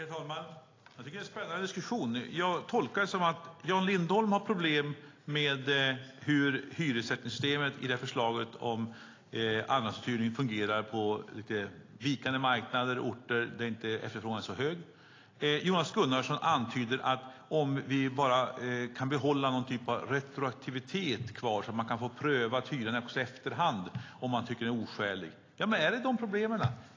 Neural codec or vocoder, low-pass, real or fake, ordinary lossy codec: none; 7.2 kHz; real; AAC, 32 kbps